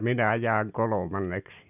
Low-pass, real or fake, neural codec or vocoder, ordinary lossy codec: 3.6 kHz; real; none; none